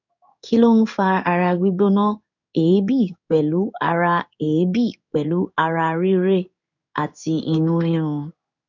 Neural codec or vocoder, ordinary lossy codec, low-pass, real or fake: codec, 16 kHz in and 24 kHz out, 1 kbps, XY-Tokenizer; none; 7.2 kHz; fake